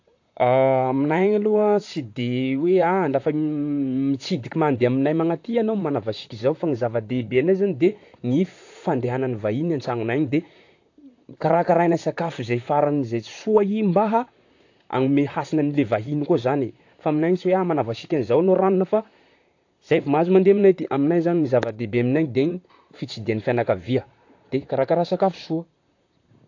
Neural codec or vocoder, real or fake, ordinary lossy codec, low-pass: vocoder, 44.1 kHz, 128 mel bands, Pupu-Vocoder; fake; AAC, 48 kbps; 7.2 kHz